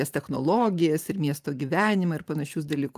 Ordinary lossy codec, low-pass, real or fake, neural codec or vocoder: Opus, 32 kbps; 14.4 kHz; fake; vocoder, 44.1 kHz, 128 mel bands every 512 samples, BigVGAN v2